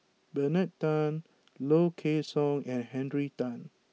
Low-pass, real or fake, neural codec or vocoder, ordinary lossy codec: none; real; none; none